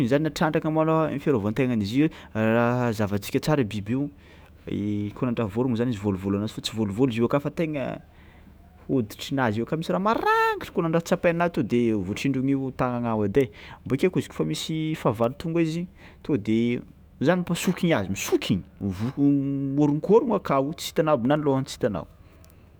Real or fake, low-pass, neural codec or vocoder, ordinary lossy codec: fake; none; autoencoder, 48 kHz, 128 numbers a frame, DAC-VAE, trained on Japanese speech; none